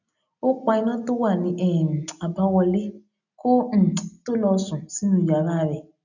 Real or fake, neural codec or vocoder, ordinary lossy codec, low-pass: real; none; none; 7.2 kHz